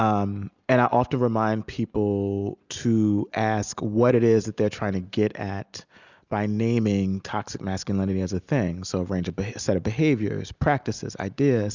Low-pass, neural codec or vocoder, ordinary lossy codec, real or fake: 7.2 kHz; none; Opus, 64 kbps; real